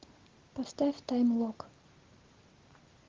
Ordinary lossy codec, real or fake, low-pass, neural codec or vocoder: Opus, 16 kbps; real; 7.2 kHz; none